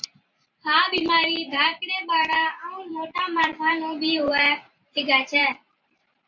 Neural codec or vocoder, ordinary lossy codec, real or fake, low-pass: vocoder, 44.1 kHz, 128 mel bands every 512 samples, BigVGAN v2; MP3, 64 kbps; fake; 7.2 kHz